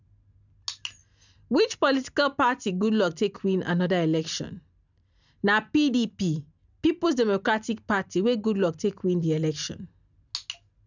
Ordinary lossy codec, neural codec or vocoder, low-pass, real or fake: none; none; 7.2 kHz; real